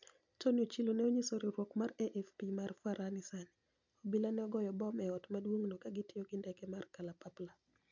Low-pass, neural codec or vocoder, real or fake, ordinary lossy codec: 7.2 kHz; none; real; none